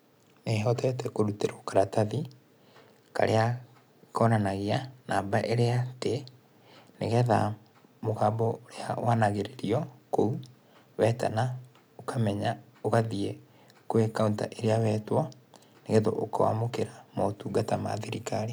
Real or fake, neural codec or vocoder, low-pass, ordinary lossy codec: real; none; none; none